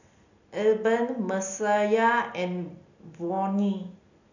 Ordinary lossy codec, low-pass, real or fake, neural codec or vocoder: none; 7.2 kHz; real; none